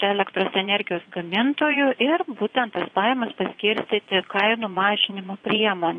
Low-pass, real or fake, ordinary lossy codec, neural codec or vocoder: 9.9 kHz; fake; AAC, 48 kbps; vocoder, 22.05 kHz, 80 mel bands, Vocos